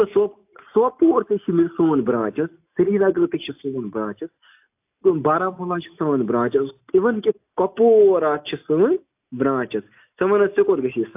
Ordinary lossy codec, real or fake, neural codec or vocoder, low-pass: AAC, 32 kbps; real; none; 3.6 kHz